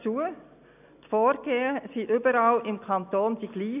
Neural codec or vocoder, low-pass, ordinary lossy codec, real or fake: none; 3.6 kHz; none; real